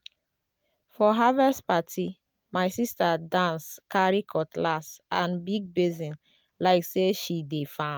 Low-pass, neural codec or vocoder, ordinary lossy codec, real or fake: none; none; none; real